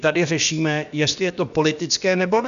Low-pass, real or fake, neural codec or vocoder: 7.2 kHz; fake; codec, 16 kHz, about 1 kbps, DyCAST, with the encoder's durations